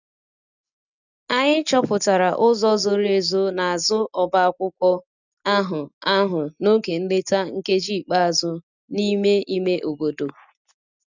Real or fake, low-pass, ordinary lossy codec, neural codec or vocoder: real; 7.2 kHz; none; none